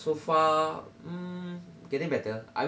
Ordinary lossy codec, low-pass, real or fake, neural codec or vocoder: none; none; real; none